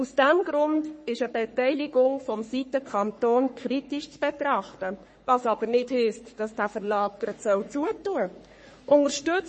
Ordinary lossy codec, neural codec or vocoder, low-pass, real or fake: MP3, 32 kbps; codec, 44.1 kHz, 3.4 kbps, Pupu-Codec; 10.8 kHz; fake